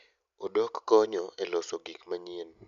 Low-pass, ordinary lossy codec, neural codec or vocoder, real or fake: 7.2 kHz; none; none; real